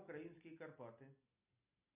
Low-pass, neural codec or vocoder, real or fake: 3.6 kHz; none; real